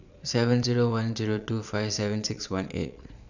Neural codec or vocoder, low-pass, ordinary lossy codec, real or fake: vocoder, 22.05 kHz, 80 mel bands, Vocos; 7.2 kHz; none; fake